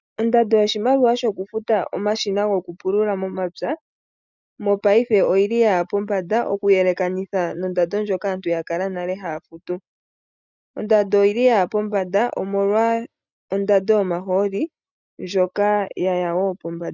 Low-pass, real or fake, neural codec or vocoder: 7.2 kHz; real; none